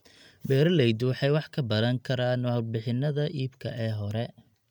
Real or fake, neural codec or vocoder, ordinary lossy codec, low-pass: real; none; MP3, 96 kbps; 19.8 kHz